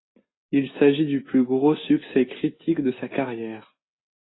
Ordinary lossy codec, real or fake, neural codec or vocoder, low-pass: AAC, 16 kbps; real; none; 7.2 kHz